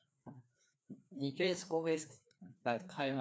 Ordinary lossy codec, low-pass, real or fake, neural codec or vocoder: none; none; fake; codec, 16 kHz, 2 kbps, FreqCodec, larger model